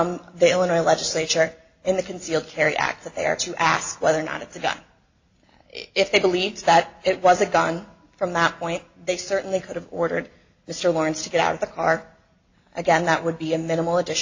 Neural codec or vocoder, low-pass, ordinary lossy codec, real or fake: none; 7.2 kHz; AAC, 48 kbps; real